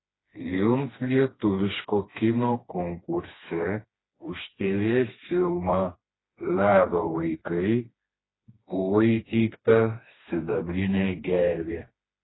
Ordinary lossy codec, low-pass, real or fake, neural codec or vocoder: AAC, 16 kbps; 7.2 kHz; fake; codec, 16 kHz, 2 kbps, FreqCodec, smaller model